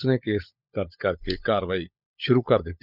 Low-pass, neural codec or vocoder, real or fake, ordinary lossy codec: 5.4 kHz; codec, 16 kHz, 16 kbps, FunCodec, trained on LibriTTS, 50 frames a second; fake; none